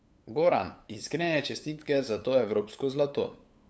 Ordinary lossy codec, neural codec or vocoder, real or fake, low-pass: none; codec, 16 kHz, 8 kbps, FunCodec, trained on LibriTTS, 25 frames a second; fake; none